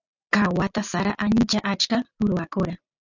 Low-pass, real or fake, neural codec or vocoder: 7.2 kHz; real; none